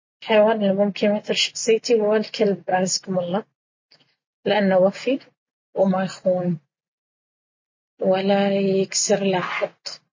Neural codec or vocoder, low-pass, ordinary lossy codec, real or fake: none; 7.2 kHz; MP3, 32 kbps; real